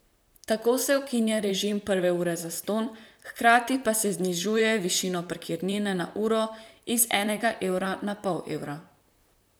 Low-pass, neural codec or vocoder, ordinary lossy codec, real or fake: none; vocoder, 44.1 kHz, 128 mel bands, Pupu-Vocoder; none; fake